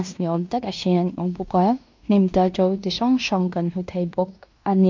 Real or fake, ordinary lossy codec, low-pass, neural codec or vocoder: fake; MP3, 64 kbps; 7.2 kHz; codec, 16 kHz in and 24 kHz out, 0.9 kbps, LongCat-Audio-Codec, fine tuned four codebook decoder